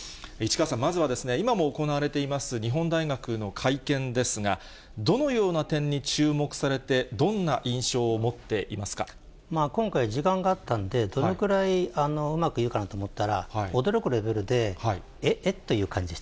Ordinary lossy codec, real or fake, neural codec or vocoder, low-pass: none; real; none; none